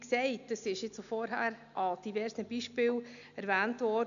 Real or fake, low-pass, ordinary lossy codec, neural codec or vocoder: real; 7.2 kHz; none; none